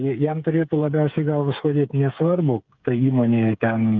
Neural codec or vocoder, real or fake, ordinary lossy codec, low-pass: codec, 16 kHz, 8 kbps, FreqCodec, smaller model; fake; Opus, 24 kbps; 7.2 kHz